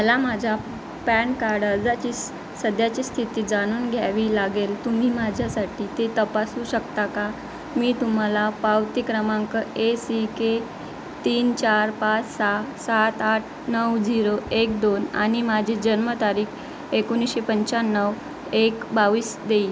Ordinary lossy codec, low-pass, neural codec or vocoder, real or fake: none; none; none; real